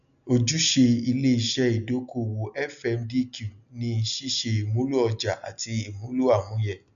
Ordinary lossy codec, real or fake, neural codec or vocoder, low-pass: none; real; none; 7.2 kHz